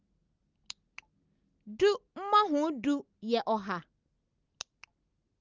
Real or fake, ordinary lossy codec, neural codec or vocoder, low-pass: real; Opus, 24 kbps; none; 7.2 kHz